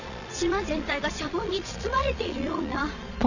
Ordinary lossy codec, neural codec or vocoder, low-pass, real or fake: none; vocoder, 22.05 kHz, 80 mel bands, Vocos; 7.2 kHz; fake